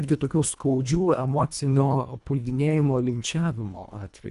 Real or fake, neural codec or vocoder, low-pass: fake; codec, 24 kHz, 1.5 kbps, HILCodec; 10.8 kHz